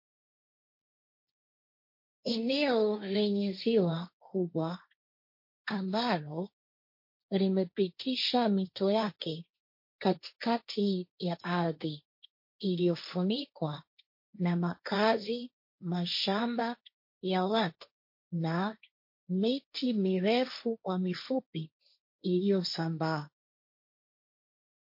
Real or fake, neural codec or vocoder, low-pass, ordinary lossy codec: fake; codec, 16 kHz, 1.1 kbps, Voila-Tokenizer; 5.4 kHz; MP3, 32 kbps